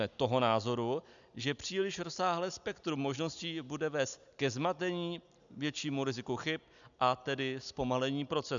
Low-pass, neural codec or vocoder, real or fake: 7.2 kHz; none; real